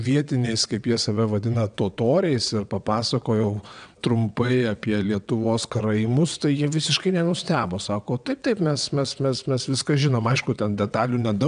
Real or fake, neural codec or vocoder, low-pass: fake; vocoder, 22.05 kHz, 80 mel bands, WaveNeXt; 9.9 kHz